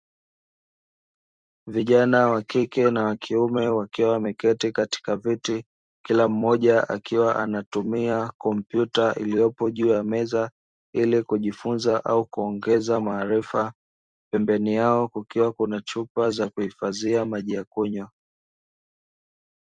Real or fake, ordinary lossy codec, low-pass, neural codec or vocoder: fake; Opus, 64 kbps; 9.9 kHz; vocoder, 44.1 kHz, 128 mel bands every 256 samples, BigVGAN v2